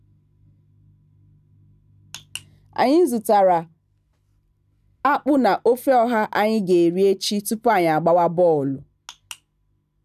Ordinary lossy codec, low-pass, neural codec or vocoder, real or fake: none; 14.4 kHz; none; real